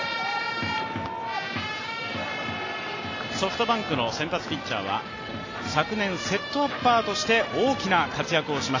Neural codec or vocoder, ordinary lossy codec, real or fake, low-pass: none; AAC, 32 kbps; real; 7.2 kHz